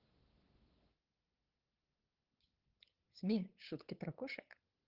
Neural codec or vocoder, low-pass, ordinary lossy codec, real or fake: codec, 16 kHz, 8 kbps, FunCodec, trained on LibriTTS, 25 frames a second; 5.4 kHz; Opus, 16 kbps; fake